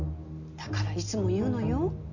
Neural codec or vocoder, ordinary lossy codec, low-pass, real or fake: none; none; 7.2 kHz; real